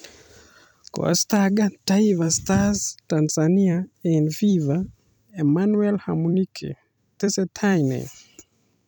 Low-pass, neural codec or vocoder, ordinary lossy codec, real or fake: none; vocoder, 44.1 kHz, 128 mel bands every 256 samples, BigVGAN v2; none; fake